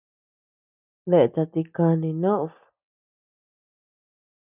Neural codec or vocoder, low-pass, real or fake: none; 3.6 kHz; real